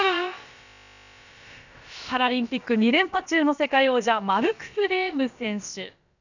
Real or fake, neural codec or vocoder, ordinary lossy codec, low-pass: fake; codec, 16 kHz, about 1 kbps, DyCAST, with the encoder's durations; none; 7.2 kHz